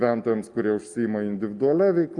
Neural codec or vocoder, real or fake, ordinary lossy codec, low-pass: none; real; Opus, 32 kbps; 10.8 kHz